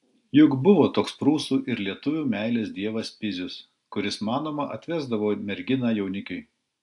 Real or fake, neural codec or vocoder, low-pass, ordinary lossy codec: real; none; 10.8 kHz; AAC, 64 kbps